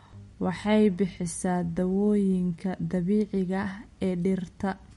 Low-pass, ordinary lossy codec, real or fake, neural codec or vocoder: 14.4 kHz; MP3, 48 kbps; real; none